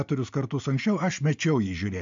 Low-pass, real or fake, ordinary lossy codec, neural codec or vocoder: 7.2 kHz; real; MP3, 64 kbps; none